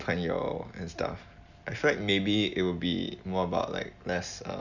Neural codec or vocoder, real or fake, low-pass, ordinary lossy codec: none; real; 7.2 kHz; none